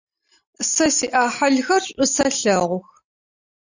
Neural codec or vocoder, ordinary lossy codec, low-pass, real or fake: none; Opus, 64 kbps; 7.2 kHz; real